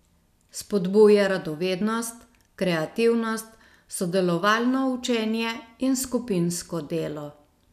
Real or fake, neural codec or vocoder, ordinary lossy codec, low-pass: real; none; none; 14.4 kHz